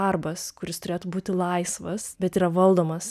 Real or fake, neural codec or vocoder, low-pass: real; none; 14.4 kHz